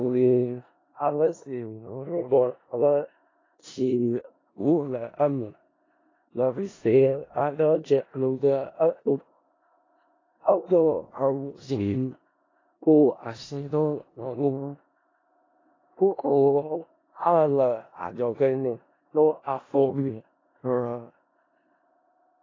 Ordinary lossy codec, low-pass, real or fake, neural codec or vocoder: AAC, 32 kbps; 7.2 kHz; fake; codec, 16 kHz in and 24 kHz out, 0.4 kbps, LongCat-Audio-Codec, four codebook decoder